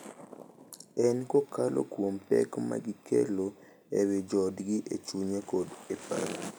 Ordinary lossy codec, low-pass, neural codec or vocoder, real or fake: none; none; none; real